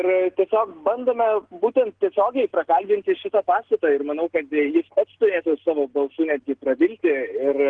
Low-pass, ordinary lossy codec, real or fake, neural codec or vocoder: 9.9 kHz; Opus, 16 kbps; real; none